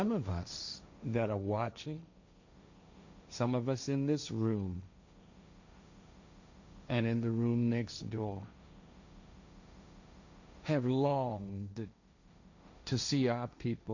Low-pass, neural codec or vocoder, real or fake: 7.2 kHz; codec, 16 kHz, 1.1 kbps, Voila-Tokenizer; fake